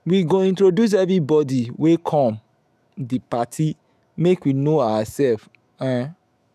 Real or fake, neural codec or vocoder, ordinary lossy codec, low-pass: fake; autoencoder, 48 kHz, 128 numbers a frame, DAC-VAE, trained on Japanese speech; none; 14.4 kHz